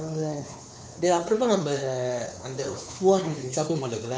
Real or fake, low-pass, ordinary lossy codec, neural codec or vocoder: fake; none; none; codec, 16 kHz, 4 kbps, X-Codec, WavLM features, trained on Multilingual LibriSpeech